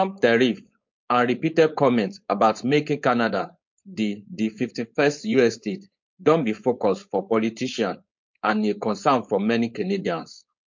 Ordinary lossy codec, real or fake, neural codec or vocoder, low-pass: MP3, 48 kbps; fake; codec, 16 kHz, 4.8 kbps, FACodec; 7.2 kHz